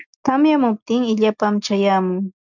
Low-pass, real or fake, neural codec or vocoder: 7.2 kHz; real; none